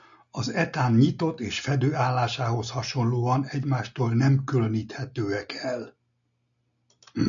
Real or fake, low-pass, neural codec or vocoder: real; 7.2 kHz; none